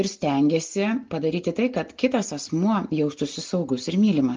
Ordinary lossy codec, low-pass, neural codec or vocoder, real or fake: Opus, 32 kbps; 7.2 kHz; none; real